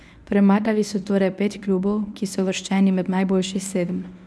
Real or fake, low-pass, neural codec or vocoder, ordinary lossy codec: fake; none; codec, 24 kHz, 0.9 kbps, WavTokenizer, medium speech release version 1; none